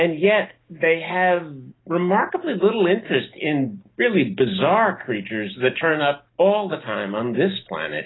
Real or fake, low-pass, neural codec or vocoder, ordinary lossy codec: real; 7.2 kHz; none; AAC, 16 kbps